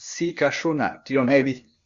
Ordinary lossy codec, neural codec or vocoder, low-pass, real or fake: Opus, 64 kbps; codec, 16 kHz, 0.8 kbps, ZipCodec; 7.2 kHz; fake